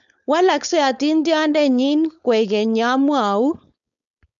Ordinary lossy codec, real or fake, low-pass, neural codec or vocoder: none; fake; 7.2 kHz; codec, 16 kHz, 4.8 kbps, FACodec